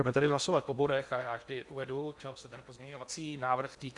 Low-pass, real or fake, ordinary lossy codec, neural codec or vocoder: 10.8 kHz; fake; Opus, 64 kbps; codec, 16 kHz in and 24 kHz out, 0.8 kbps, FocalCodec, streaming, 65536 codes